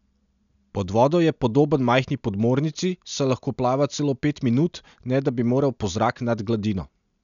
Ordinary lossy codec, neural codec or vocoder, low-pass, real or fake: none; none; 7.2 kHz; real